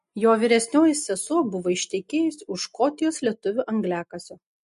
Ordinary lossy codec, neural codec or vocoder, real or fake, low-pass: MP3, 48 kbps; none; real; 14.4 kHz